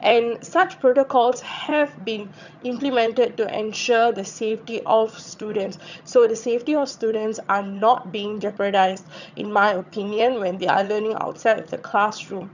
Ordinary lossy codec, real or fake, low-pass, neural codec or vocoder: none; fake; 7.2 kHz; vocoder, 22.05 kHz, 80 mel bands, HiFi-GAN